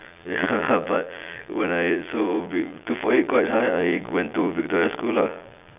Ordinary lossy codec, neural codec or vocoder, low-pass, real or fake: none; vocoder, 22.05 kHz, 80 mel bands, Vocos; 3.6 kHz; fake